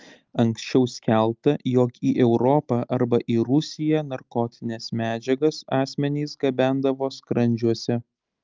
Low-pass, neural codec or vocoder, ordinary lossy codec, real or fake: 7.2 kHz; none; Opus, 24 kbps; real